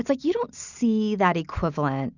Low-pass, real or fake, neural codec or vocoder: 7.2 kHz; real; none